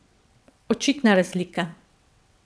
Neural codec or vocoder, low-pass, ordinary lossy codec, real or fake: vocoder, 22.05 kHz, 80 mel bands, WaveNeXt; none; none; fake